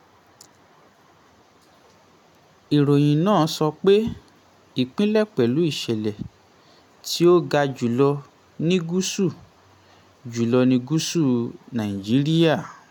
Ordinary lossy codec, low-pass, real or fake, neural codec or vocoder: none; 19.8 kHz; real; none